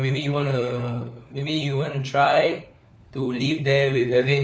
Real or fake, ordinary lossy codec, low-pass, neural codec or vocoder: fake; none; none; codec, 16 kHz, 4 kbps, FunCodec, trained on LibriTTS, 50 frames a second